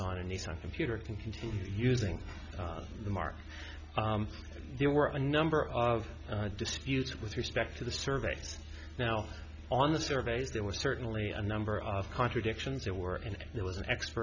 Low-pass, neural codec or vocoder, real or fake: 7.2 kHz; none; real